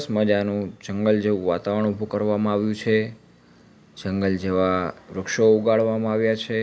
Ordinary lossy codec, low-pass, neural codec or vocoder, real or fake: none; none; none; real